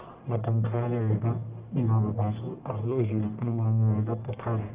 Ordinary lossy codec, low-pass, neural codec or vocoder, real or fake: Opus, 32 kbps; 3.6 kHz; codec, 44.1 kHz, 1.7 kbps, Pupu-Codec; fake